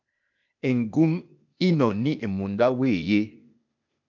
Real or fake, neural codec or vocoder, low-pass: fake; codec, 16 kHz, 0.8 kbps, ZipCodec; 7.2 kHz